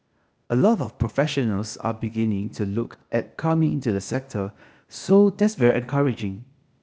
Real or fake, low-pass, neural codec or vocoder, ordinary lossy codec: fake; none; codec, 16 kHz, 0.8 kbps, ZipCodec; none